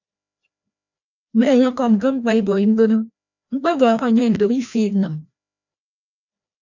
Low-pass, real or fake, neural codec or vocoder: 7.2 kHz; fake; codec, 16 kHz, 1 kbps, FreqCodec, larger model